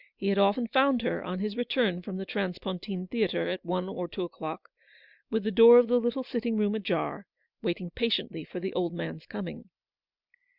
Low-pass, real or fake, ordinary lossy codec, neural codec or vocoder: 5.4 kHz; real; Opus, 64 kbps; none